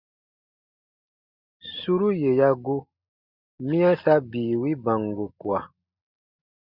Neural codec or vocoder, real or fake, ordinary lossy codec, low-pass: none; real; Opus, 64 kbps; 5.4 kHz